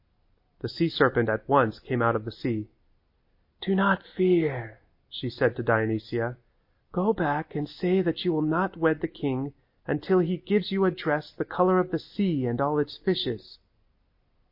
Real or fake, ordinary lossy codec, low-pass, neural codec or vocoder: real; MP3, 32 kbps; 5.4 kHz; none